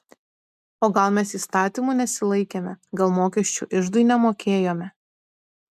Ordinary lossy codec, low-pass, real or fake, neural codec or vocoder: MP3, 96 kbps; 14.4 kHz; real; none